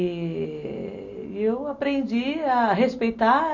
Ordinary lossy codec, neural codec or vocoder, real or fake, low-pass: none; none; real; 7.2 kHz